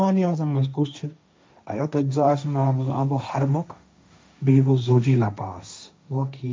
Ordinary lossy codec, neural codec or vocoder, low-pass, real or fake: none; codec, 16 kHz, 1.1 kbps, Voila-Tokenizer; none; fake